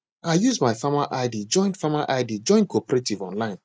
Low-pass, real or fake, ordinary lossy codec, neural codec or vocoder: none; real; none; none